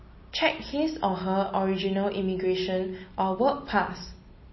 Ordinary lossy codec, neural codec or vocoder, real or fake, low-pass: MP3, 24 kbps; none; real; 7.2 kHz